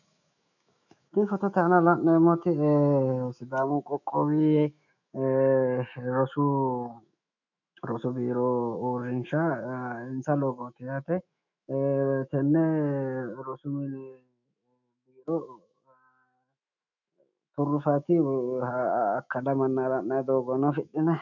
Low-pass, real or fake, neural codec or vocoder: 7.2 kHz; fake; autoencoder, 48 kHz, 128 numbers a frame, DAC-VAE, trained on Japanese speech